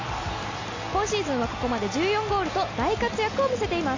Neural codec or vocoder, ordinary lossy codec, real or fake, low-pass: none; MP3, 48 kbps; real; 7.2 kHz